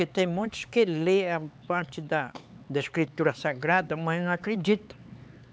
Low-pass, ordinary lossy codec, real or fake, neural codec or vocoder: none; none; fake; codec, 16 kHz, 4 kbps, X-Codec, HuBERT features, trained on LibriSpeech